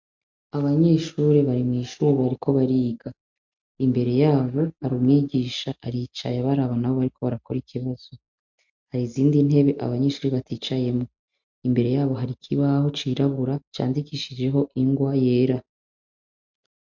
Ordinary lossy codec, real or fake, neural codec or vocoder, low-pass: MP3, 48 kbps; real; none; 7.2 kHz